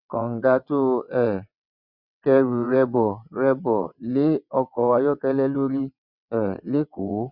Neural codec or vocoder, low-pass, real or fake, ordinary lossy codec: vocoder, 22.05 kHz, 80 mel bands, WaveNeXt; 5.4 kHz; fake; none